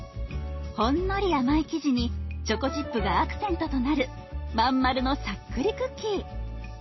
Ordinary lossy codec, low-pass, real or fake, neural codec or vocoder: MP3, 24 kbps; 7.2 kHz; real; none